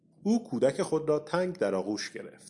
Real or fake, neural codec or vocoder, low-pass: real; none; 10.8 kHz